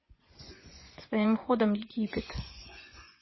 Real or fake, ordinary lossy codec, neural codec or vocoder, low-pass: fake; MP3, 24 kbps; vocoder, 24 kHz, 100 mel bands, Vocos; 7.2 kHz